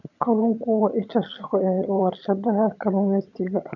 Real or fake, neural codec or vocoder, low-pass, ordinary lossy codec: fake; vocoder, 22.05 kHz, 80 mel bands, HiFi-GAN; 7.2 kHz; none